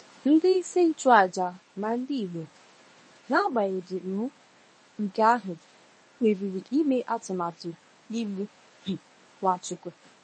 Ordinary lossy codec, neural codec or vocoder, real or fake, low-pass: MP3, 32 kbps; codec, 24 kHz, 0.9 kbps, WavTokenizer, medium speech release version 1; fake; 10.8 kHz